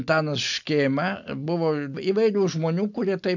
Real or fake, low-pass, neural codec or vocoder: real; 7.2 kHz; none